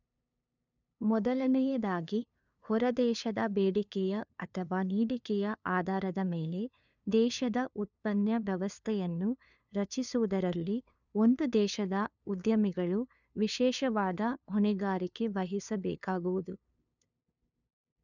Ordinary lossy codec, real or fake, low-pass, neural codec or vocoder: none; fake; 7.2 kHz; codec, 16 kHz, 2 kbps, FunCodec, trained on LibriTTS, 25 frames a second